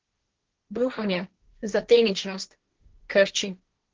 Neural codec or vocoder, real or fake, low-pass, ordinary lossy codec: codec, 16 kHz, 1.1 kbps, Voila-Tokenizer; fake; 7.2 kHz; Opus, 16 kbps